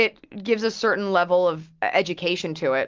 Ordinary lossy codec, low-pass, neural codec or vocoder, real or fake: Opus, 32 kbps; 7.2 kHz; none; real